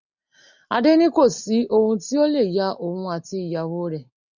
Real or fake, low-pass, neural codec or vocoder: real; 7.2 kHz; none